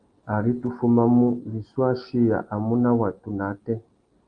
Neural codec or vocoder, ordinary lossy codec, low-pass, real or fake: none; Opus, 24 kbps; 9.9 kHz; real